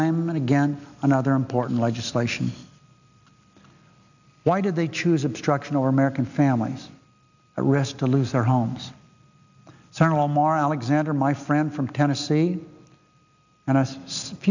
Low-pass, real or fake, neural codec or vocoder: 7.2 kHz; real; none